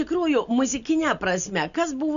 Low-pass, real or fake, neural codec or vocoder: 7.2 kHz; real; none